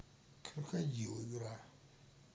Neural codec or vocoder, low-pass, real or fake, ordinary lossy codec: none; none; real; none